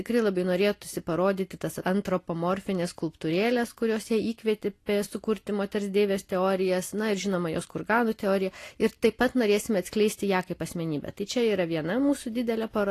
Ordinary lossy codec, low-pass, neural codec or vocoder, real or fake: AAC, 48 kbps; 14.4 kHz; none; real